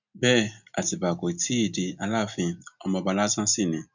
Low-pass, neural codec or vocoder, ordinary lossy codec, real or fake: 7.2 kHz; none; none; real